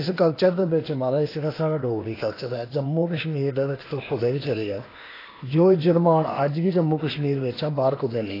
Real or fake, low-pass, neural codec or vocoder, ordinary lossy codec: fake; 5.4 kHz; codec, 16 kHz, 0.8 kbps, ZipCodec; AAC, 24 kbps